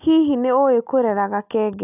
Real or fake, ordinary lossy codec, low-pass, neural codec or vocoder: real; none; 3.6 kHz; none